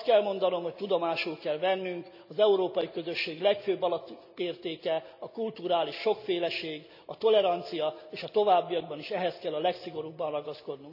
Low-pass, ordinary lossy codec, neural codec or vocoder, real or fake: 5.4 kHz; none; none; real